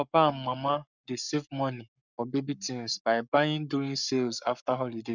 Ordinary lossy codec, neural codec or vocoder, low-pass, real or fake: none; codec, 44.1 kHz, 7.8 kbps, Pupu-Codec; 7.2 kHz; fake